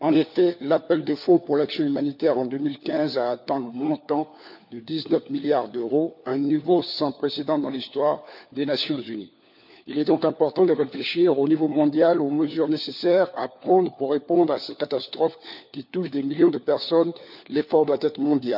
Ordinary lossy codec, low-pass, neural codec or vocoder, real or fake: none; 5.4 kHz; codec, 16 kHz, 4 kbps, FunCodec, trained on LibriTTS, 50 frames a second; fake